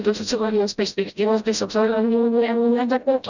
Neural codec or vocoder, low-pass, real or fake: codec, 16 kHz, 0.5 kbps, FreqCodec, smaller model; 7.2 kHz; fake